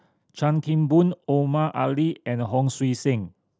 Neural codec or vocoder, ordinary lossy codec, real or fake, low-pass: none; none; real; none